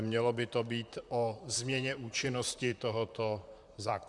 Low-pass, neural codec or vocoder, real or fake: 10.8 kHz; vocoder, 44.1 kHz, 128 mel bands, Pupu-Vocoder; fake